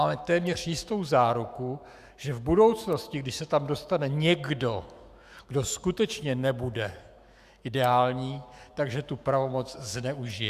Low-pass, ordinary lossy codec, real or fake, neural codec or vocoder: 14.4 kHz; Opus, 64 kbps; fake; autoencoder, 48 kHz, 128 numbers a frame, DAC-VAE, trained on Japanese speech